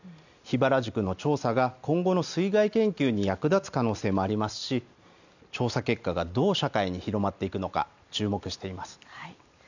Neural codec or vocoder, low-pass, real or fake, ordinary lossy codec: none; 7.2 kHz; real; none